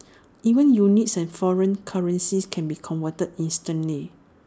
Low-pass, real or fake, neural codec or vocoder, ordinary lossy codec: none; real; none; none